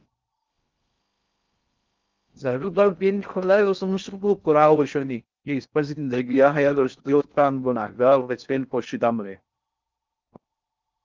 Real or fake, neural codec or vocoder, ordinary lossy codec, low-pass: fake; codec, 16 kHz in and 24 kHz out, 0.6 kbps, FocalCodec, streaming, 4096 codes; Opus, 24 kbps; 7.2 kHz